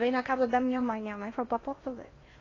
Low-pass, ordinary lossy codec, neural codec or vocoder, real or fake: 7.2 kHz; AAC, 32 kbps; codec, 16 kHz in and 24 kHz out, 0.6 kbps, FocalCodec, streaming, 4096 codes; fake